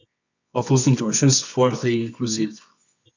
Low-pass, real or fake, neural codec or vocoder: 7.2 kHz; fake; codec, 24 kHz, 0.9 kbps, WavTokenizer, medium music audio release